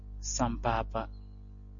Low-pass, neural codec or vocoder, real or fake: 7.2 kHz; none; real